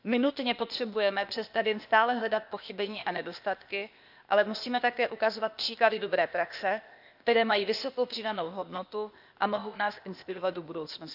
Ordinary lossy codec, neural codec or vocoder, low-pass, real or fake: none; codec, 16 kHz, 0.8 kbps, ZipCodec; 5.4 kHz; fake